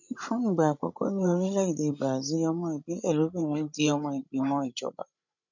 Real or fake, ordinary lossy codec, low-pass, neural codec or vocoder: fake; none; 7.2 kHz; codec, 16 kHz, 16 kbps, FreqCodec, larger model